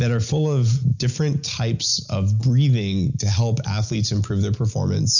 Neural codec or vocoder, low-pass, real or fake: none; 7.2 kHz; real